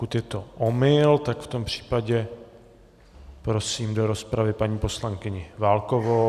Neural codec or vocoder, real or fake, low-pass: none; real; 14.4 kHz